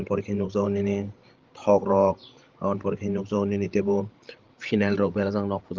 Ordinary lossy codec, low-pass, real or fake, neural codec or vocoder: Opus, 16 kbps; 7.2 kHz; fake; codec, 16 kHz, 16 kbps, FreqCodec, larger model